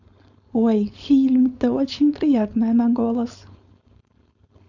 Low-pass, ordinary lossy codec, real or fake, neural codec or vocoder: 7.2 kHz; Opus, 64 kbps; fake; codec, 16 kHz, 4.8 kbps, FACodec